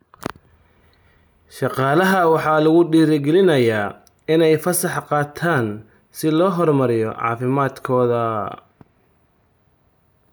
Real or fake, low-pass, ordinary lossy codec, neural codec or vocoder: real; none; none; none